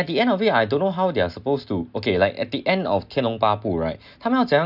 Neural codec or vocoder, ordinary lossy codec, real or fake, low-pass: none; none; real; 5.4 kHz